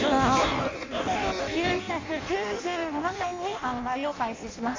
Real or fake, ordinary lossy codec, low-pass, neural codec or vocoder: fake; MP3, 48 kbps; 7.2 kHz; codec, 16 kHz in and 24 kHz out, 0.6 kbps, FireRedTTS-2 codec